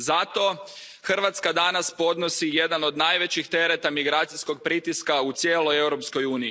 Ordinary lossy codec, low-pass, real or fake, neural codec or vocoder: none; none; real; none